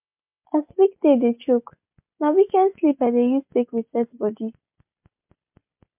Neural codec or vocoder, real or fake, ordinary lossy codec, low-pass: none; real; MP3, 32 kbps; 3.6 kHz